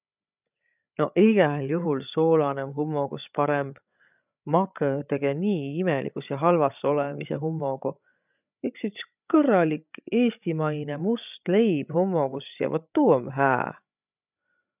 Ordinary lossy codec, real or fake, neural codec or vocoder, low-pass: none; fake; codec, 16 kHz, 8 kbps, FreqCodec, larger model; 3.6 kHz